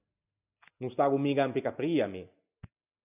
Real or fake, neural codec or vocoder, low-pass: real; none; 3.6 kHz